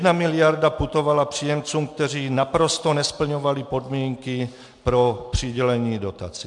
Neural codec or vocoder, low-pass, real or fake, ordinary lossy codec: none; 10.8 kHz; real; MP3, 64 kbps